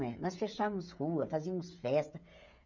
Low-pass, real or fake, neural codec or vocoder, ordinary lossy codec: 7.2 kHz; fake; codec, 16 kHz, 16 kbps, FreqCodec, smaller model; none